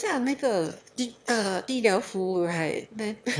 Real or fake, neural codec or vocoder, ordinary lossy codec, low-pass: fake; autoencoder, 22.05 kHz, a latent of 192 numbers a frame, VITS, trained on one speaker; none; none